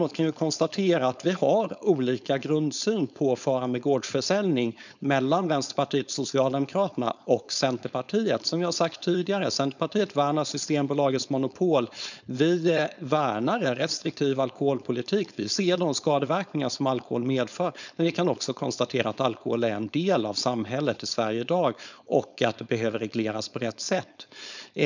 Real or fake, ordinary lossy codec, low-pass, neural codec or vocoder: fake; none; 7.2 kHz; codec, 16 kHz, 4.8 kbps, FACodec